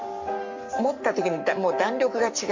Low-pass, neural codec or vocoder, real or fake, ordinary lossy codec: 7.2 kHz; codec, 44.1 kHz, 7.8 kbps, Pupu-Codec; fake; MP3, 48 kbps